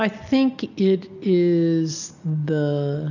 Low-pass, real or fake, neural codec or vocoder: 7.2 kHz; real; none